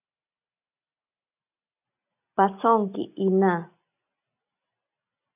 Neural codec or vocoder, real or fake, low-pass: none; real; 3.6 kHz